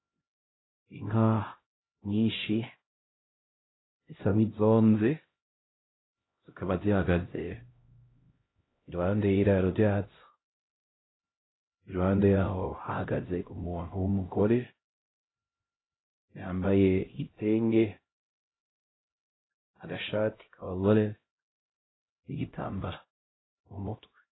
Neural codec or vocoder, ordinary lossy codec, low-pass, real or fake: codec, 16 kHz, 0.5 kbps, X-Codec, HuBERT features, trained on LibriSpeech; AAC, 16 kbps; 7.2 kHz; fake